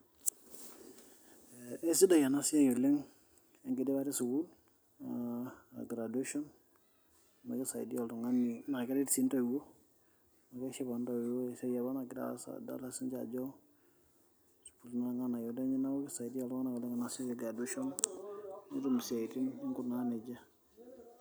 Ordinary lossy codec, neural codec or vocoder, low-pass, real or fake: none; none; none; real